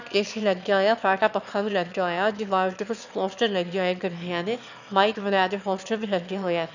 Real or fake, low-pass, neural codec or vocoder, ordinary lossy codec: fake; 7.2 kHz; autoencoder, 22.05 kHz, a latent of 192 numbers a frame, VITS, trained on one speaker; none